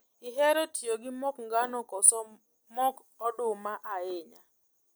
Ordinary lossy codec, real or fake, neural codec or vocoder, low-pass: none; real; none; none